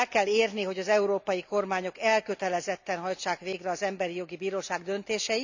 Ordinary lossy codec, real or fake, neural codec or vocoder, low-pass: none; real; none; 7.2 kHz